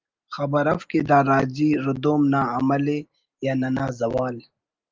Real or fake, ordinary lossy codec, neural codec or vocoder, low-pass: real; Opus, 32 kbps; none; 7.2 kHz